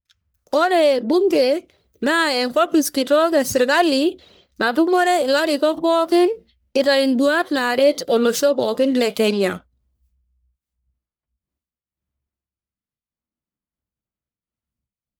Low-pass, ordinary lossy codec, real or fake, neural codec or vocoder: none; none; fake; codec, 44.1 kHz, 1.7 kbps, Pupu-Codec